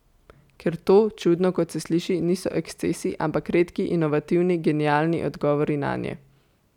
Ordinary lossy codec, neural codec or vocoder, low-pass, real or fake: none; none; 19.8 kHz; real